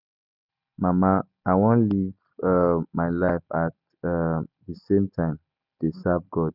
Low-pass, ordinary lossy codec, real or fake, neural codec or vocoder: 5.4 kHz; none; real; none